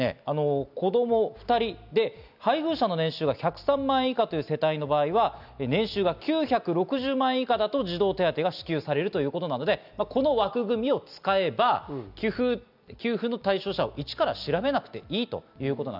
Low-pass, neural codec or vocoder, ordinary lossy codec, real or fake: 5.4 kHz; none; none; real